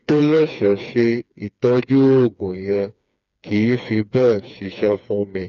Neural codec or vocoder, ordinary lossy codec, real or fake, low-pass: codec, 16 kHz, 4 kbps, FreqCodec, smaller model; none; fake; 7.2 kHz